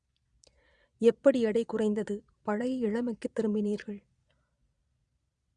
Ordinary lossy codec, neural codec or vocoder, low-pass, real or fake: Opus, 64 kbps; vocoder, 22.05 kHz, 80 mel bands, Vocos; 9.9 kHz; fake